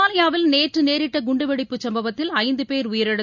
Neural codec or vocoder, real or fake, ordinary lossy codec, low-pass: none; real; none; 7.2 kHz